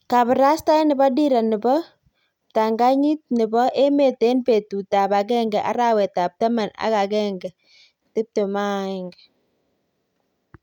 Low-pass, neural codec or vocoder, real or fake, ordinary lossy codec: 19.8 kHz; none; real; none